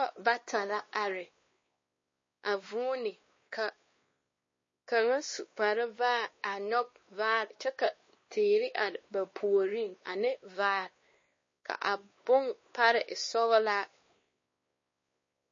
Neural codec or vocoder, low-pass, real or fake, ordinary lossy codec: codec, 16 kHz, 2 kbps, X-Codec, WavLM features, trained on Multilingual LibriSpeech; 7.2 kHz; fake; MP3, 32 kbps